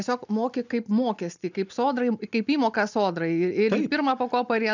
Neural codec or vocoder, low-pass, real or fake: none; 7.2 kHz; real